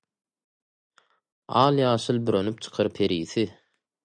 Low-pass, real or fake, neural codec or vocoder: 9.9 kHz; real; none